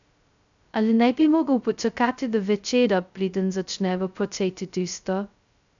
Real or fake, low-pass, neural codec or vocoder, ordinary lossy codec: fake; 7.2 kHz; codec, 16 kHz, 0.2 kbps, FocalCodec; none